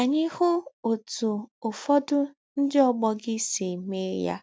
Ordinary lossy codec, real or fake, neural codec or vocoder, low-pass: none; real; none; none